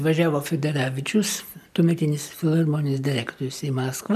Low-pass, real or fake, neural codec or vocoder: 14.4 kHz; real; none